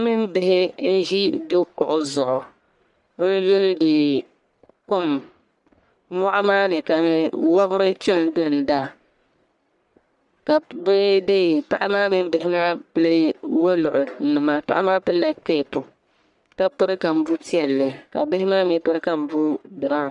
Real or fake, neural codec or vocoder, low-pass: fake; codec, 44.1 kHz, 1.7 kbps, Pupu-Codec; 10.8 kHz